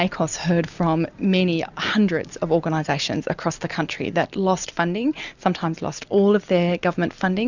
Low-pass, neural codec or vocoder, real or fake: 7.2 kHz; none; real